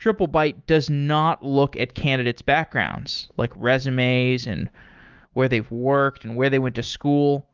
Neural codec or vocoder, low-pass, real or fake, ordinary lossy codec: autoencoder, 48 kHz, 128 numbers a frame, DAC-VAE, trained on Japanese speech; 7.2 kHz; fake; Opus, 32 kbps